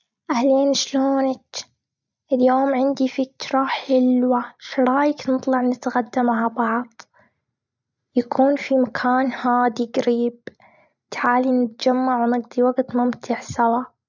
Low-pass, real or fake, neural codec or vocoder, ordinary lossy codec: 7.2 kHz; real; none; none